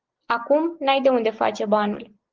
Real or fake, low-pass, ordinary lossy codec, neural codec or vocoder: real; 7.2 kHz; Opus, 16 kbps; none